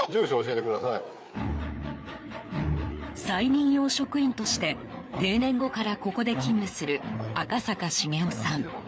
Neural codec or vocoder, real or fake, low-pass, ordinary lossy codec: codec, 16 kHz, 4 kbps, FreqCodec, larger model; fake; none; none